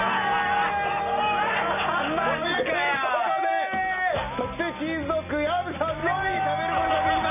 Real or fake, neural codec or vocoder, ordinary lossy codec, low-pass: real; none; none; 3.6 kHz